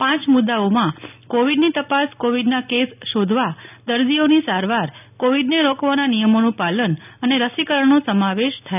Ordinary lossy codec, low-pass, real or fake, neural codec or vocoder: none; 3.6 kHz; real; none